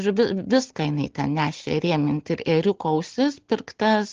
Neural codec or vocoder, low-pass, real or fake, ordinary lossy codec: none; 7.2 kHz; real; Opus, 16 kbps